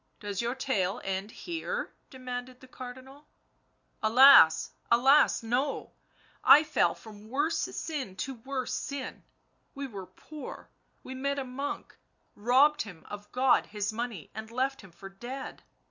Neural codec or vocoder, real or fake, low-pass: none; real; 7.2 kHz